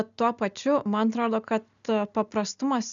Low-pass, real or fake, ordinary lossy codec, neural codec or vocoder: 7.2 kHz; real; MP3, 96 kbps; none